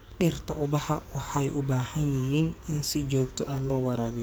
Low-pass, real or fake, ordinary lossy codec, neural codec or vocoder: none; fake; none; codec, 44.1 kHz, 2.6 kbps, SNAC